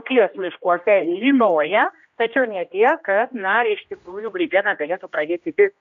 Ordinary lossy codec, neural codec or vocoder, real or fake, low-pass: AAC, 64 kbps; codec, 16 kHz, 1 kbps, X-Codec, HuBERT features, trained on general audio; fake; 7.2 kHz